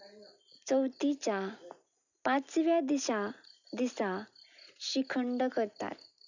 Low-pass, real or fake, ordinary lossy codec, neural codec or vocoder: 7.2 kHz; real; none; none